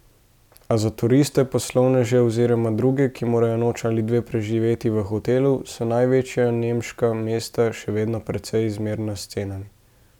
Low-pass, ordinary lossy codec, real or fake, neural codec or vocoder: 19.8 kHz; none; real; none